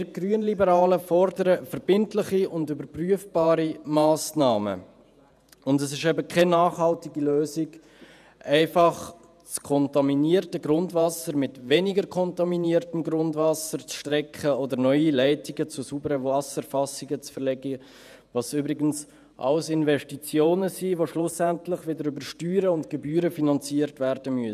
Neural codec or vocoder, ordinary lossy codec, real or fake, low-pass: vocoder, 48 kHz, 128 mel bands, Vocos; none; fake; 14.4 kHz